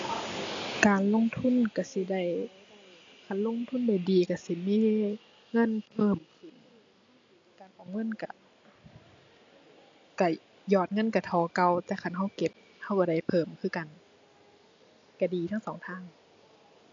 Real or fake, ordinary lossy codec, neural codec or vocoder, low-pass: real; AAC, 48 kbps; none; 7.2 kHz